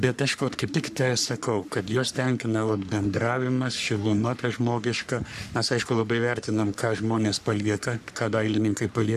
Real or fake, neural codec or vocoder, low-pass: fake; codec, 44.1 kHz, 3.4 kbps, Pupu-Codec; 14.4 kHz